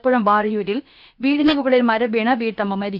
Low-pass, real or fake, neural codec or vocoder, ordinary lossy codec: 5.4 kHz; fake; codec, 16 kHz, about 1 kbps, DyCAST, with the encoder's durations; none